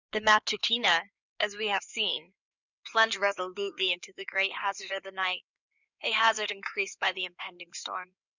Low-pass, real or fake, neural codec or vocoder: 7.2 kHz; fake; codec, 16 kHz in and 24 kHz out, 2.2 kbps, FireRedTTS-2 codec